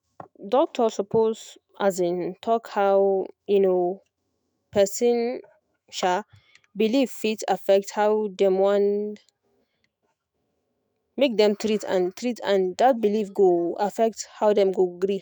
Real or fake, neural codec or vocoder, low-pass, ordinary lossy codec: fake; autoencoder, 48 kHz, 128 numbers a frame, DAC-VAE, trained on Japanese speech; none; none